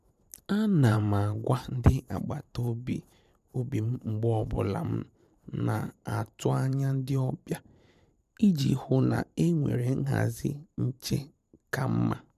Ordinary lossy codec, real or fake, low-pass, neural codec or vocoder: none; fake; 14.4 kHz; vocoder, 44.1 kHz, 128 mel bands every 512 samples, BigVGAN v2